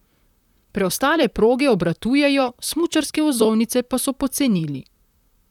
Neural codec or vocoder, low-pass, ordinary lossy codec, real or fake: vocoder, 44.1 kHz, 128 mel bands, Pupu-Vocoder; 19.8 kHz; none; fake